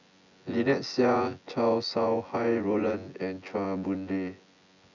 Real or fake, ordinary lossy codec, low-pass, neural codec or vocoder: fake; none; 7.2 kHz; vocoder, 24 kHz, 100 mel bands, Vocos